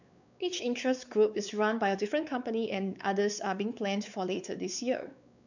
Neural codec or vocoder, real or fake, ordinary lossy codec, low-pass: codec, 16 kHz, 4 kbps, X-Codec, WavLM features, trained on Multilingual LibriSpeech; fake; none; 7.2 kHz